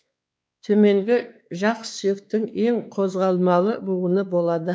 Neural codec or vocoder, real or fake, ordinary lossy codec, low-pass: codec, 16 kHz, 2 kbps, X-Codec, WavLM features, trained on Multilingual LibriSpeech; fake; none; none